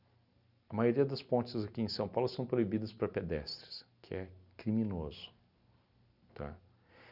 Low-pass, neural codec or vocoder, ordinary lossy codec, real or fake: 5.4 kHz; none; none; real